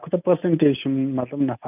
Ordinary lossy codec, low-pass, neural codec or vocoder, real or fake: none; 3.6 kHz; none; real